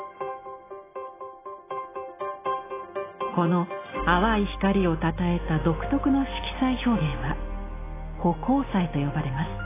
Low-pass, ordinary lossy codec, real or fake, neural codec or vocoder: 3.6 kHz; AAC, 16 kbps; real; none